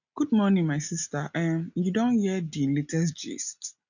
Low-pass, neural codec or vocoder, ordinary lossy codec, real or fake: 7.2 kHz; none; none; real